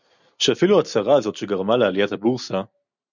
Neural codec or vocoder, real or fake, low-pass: none; real; 7.2 kHz